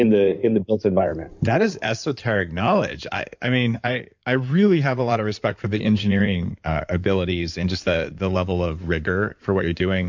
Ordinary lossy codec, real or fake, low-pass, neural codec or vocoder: MP3, 64 kbps; fake; 7.2 kHz; codec, 16 kHz in and 24 kHz out, 2.2 kbps, FireRedTTS-2 codec